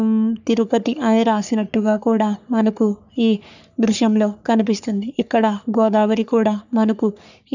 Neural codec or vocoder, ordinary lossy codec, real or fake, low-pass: codec, 44.1 kHz, 3.4 kbps, Pupu-Codec; none; fake; 7.2 kHz